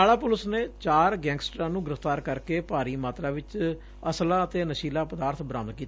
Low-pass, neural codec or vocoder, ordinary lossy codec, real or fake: none; none; none; real